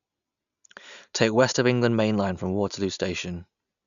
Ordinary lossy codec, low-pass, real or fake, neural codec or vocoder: none; 7.2 kHz; real; none